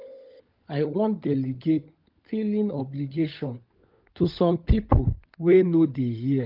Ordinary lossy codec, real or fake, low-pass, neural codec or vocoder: Opus, 24 kbps; fake; 5.4 kHz; codec, 16 kHz, 16 kbps, FunCodec, trained on LibriTTS, 50 frames a second